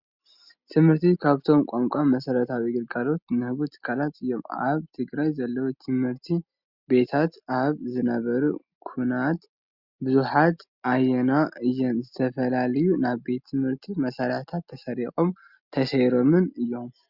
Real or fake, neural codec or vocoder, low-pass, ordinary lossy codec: real; none; 5.4 kHz; Opus, 64 kbps